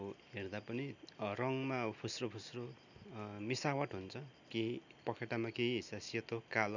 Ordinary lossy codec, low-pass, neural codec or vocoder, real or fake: none; 7.2 kHz; none; real